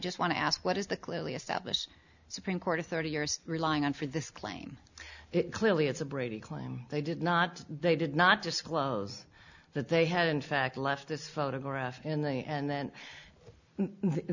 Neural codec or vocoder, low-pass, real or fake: none; 7.2 kHz; real